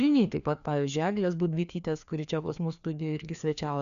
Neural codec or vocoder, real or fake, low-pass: codec, 16 kHz, 2 kbps, FreqCodec, larger model; fake; 7.2 kHz